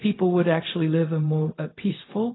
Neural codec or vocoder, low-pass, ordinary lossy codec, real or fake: codec, 16 kHz, 0.4 kbps, LongCat-Audio-Codec; 7.2 kHz; AAC, 16 kbps; fake